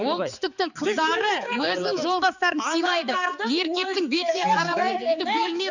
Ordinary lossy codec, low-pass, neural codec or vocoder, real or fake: none; 7.2 kHz; codec, 16 kHz, 4 kbps, X-Codec, HuBERT features, trained on balanced general audio; fake